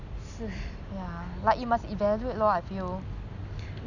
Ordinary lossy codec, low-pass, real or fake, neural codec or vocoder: none; 7.2 kHz; real; none